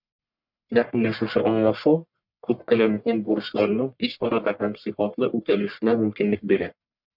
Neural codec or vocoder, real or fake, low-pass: codec, 44.1 kHz, 1.7 kbps, Pupu-Codec; fake; 5.4 kHz